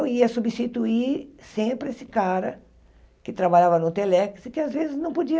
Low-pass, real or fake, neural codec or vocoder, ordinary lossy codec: none; real; none; none